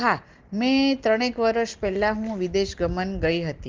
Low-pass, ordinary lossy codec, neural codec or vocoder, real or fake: 7.2 kHz; Opus, 16 kbps; none; real